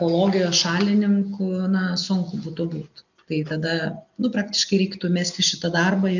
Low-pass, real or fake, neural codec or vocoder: 7.2 kHz; real; none